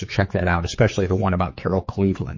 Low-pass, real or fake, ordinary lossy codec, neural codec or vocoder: 7.2 kHz; fake; MP3, 32 kbps; codec, 16 kHz, 4 kbps, X-Codec, HuBERT features, trained on general audio